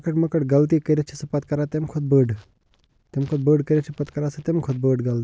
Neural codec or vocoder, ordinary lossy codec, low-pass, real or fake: none; none; none; real